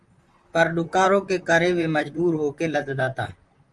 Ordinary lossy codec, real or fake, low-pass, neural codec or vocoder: Opus, 32 kbps; fake; 10.8 kHz; vocoder, 44.1 kHz, 128 mel bands, Pupu-Vocoder